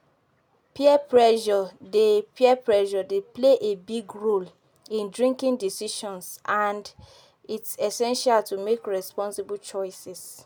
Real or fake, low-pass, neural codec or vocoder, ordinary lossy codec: real; none; none; none